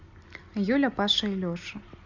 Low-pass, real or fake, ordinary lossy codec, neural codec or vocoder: 7.2 kHz; real; none; none